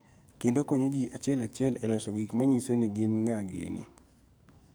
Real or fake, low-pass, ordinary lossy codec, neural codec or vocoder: fake; none; none; codec, 44.1 kHz, 2.6 kbps, SNAC